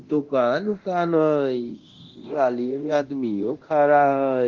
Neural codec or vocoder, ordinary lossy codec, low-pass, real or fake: codec, 24 kHz, 0.9 kbps, DualCodec; Opus, 16 kbps; 7.2 kHz; fake